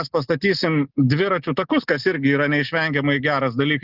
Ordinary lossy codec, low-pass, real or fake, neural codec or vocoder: Opus, 32 kbps; 5.4 kHz; real; none